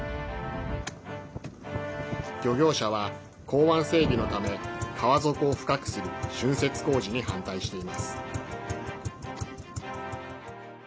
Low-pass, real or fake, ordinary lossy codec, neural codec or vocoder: none; real; none; none